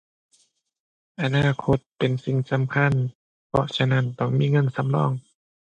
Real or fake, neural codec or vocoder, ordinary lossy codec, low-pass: real; none; AAC, 96 kbps; 10.8 kHz